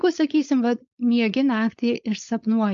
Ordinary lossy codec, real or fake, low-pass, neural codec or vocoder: AAC, 64 kbps; fake; 7.2 kHz; codec, 16 kHz, 4.8 kbps, FACodec